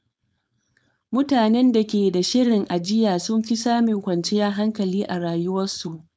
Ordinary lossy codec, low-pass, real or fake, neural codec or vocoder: none; none; fake; codec, 16 kHz, 4.8 kbps, FACodec